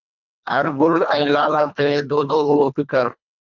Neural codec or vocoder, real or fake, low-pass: codec, 24 kHz, 1.5 kbps, HILCodec; fake; 7.2 kHz